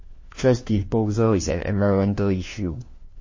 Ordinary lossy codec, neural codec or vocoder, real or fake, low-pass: MP3, 32 kbps; codec, 16 kHz, 1 kbps, FunCodec, trained on LibriTTS, 50 frames a second; fake; 7.2 kHz